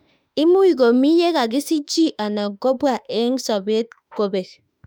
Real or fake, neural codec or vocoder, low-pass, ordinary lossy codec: fake; autoencoder, 48 kHz, 32 numbers a frame, DAC-VAE, trained on Japanese speech; 19.8 kHz; none